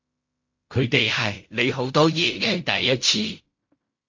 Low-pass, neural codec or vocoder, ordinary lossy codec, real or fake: 7.2 kHz; codec, 16 kHz in and 24 kHz out, 0.4 kbps, LongCat-Audio-Codec, fine tuned four codebook decoder; MP3, 64 kbps; fake